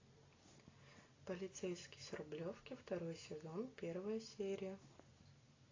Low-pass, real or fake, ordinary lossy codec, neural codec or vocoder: 7.2 kHz; real; AAC, 32 kbps; none